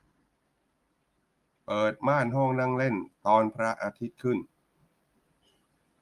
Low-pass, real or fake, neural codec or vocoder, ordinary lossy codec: 14.4 kHz; real; none; Opus, 32 kbps